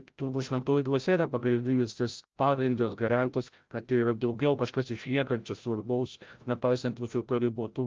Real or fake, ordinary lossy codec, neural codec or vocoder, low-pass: fake; Opus, 24 kbps; codec, 16 kHz, 0.5 kbps, FreqCodec, larger model; 7.2 kHz